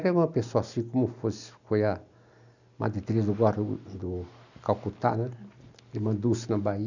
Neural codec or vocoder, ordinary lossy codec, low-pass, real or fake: none; none; 7.2 kHz; real